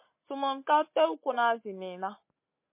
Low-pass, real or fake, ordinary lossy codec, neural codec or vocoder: 3.6 kHz; real; MP3, 24 kbps; none